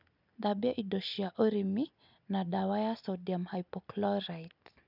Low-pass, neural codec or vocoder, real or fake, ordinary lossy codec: 5.4 kHz; none; real; none